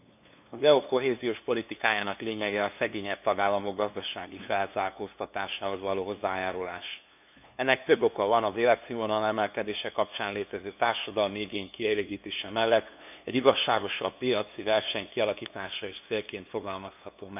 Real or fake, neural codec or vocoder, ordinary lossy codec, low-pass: fake; codec, 16 kHz, 2 kbps, FunCodec, trained on LibriTTS, 25 frames a second; none; 3.6 kHz